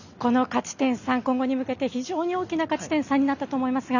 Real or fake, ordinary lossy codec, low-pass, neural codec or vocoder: real; none; 7.2 kHz; none